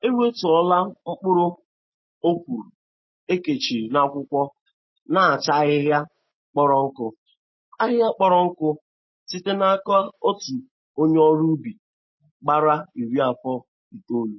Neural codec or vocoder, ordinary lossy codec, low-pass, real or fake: none; MP3, 24 kbps; 7.2 kHz; real